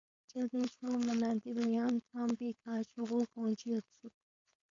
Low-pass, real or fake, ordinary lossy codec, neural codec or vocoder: 7.2 kHz; fake; AAC, 48 kbps; codec, 16 kHz, 4.8 kbps, FACodec